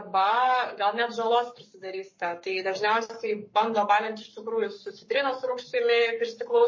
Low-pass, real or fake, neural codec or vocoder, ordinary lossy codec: 7.2 kHz; fake; codec, 44.1 kHz, 7.8 kbps, Pupu-Codec; MP3, 32 kbps